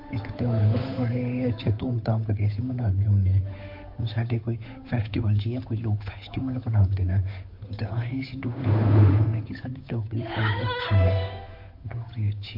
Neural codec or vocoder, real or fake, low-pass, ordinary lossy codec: codec, 16 kHz, 8 kbps, FunCodec, trained on Chinese and English, 25 frames a second; fake; 5.4 kHz; MP3, 32 kbps